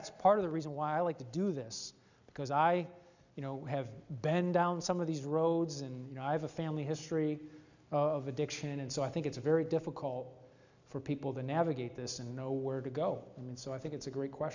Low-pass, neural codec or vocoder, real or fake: 7.2 kHz; none; real